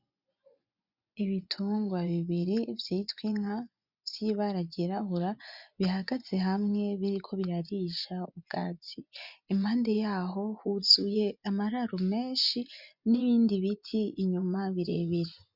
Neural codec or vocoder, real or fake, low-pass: vocoder, 24 kHz, 100 mel bands, Vocos; fake; 5.4 kHz